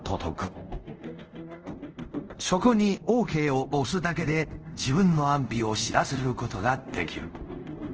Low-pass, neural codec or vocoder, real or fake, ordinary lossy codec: 7.2 kHz; codec, 24 kHz, 0.5 kbps, DualCodec; fake; Opus, 16 kbps